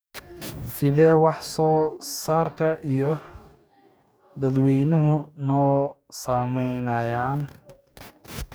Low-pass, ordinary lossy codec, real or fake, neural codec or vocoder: none; none; fake; codec, 44.1 kHz, 2.6 kbps, DAC